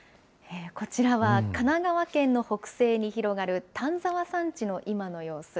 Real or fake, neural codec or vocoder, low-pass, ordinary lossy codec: real; none; none; none